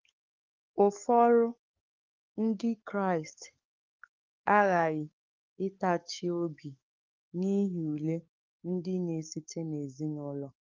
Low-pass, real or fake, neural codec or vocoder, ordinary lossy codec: 7.2 kHz; fake; codec, 44.1 kHz, 7.8 kbps, DAC; Opus, 24 kbps